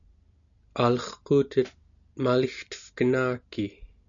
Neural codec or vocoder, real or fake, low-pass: none; real; 7.2 kHz